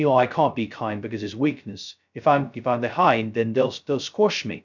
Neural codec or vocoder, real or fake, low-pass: codec, 16 kHz, 0.2 kbps, FocalCodec; fake; 7.2 kHz